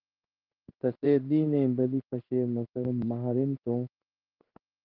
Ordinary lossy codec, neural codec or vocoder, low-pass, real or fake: Opus, 24 kbps; codec, 16 kHz in and 24 kHz out, 1 kbps, XY-Tokenizer; 5.4 kHz; fake